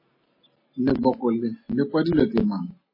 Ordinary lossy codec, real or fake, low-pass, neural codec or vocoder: MP3, 24 kbps; real; 5.4 kHz; none